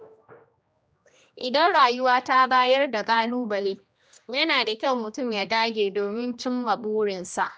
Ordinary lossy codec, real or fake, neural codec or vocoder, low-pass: none; fake; codec, 16 kHz, 1 kbps, X-Codec, HuBERT features, trained on general audio; none